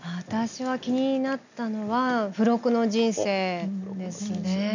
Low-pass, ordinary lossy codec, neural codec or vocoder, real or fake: 7.2 kHz; none; none; real